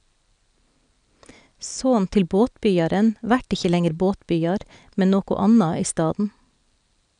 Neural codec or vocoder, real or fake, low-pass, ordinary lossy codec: none; real; 9.9 kHz; none